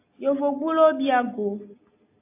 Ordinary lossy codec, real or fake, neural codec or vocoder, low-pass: AAC, 24 kbps; real; none; 3.6 kHz